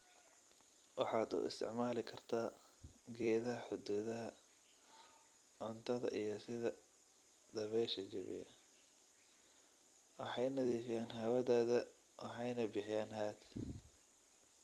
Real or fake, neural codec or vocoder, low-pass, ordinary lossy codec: fake; vocoder, 44.1 kHz, 128 mel bands every 256 samples, BigVGAN v2; 19.8 kHz; Opus, 32 kbps